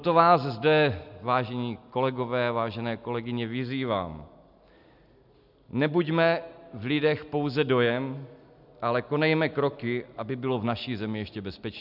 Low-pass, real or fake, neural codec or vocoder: 5.4 kHz; real; none